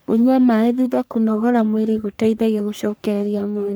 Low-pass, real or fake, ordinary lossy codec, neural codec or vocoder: none; fake; none; codec, 44.1 kHz, 3.4 kbps, Pupu-Codec